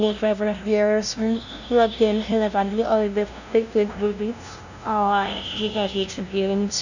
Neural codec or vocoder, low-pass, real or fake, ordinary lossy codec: codec, 16 kHz, 0.5 kbps, FunCodec, trained on LibriTTS, 25 frames a second; 7.2 kHz; fake; none